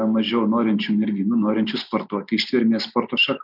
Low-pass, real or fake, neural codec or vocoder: 5.4 kHz; real; none